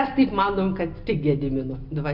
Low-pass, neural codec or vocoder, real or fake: 5.4 kHz; none; real